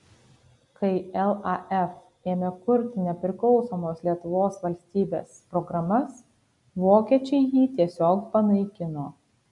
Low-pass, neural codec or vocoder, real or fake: 10.8 kHz; none; real